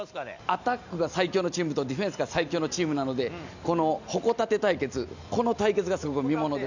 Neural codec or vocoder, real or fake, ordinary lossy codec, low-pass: none; real; none; 7.2 kHz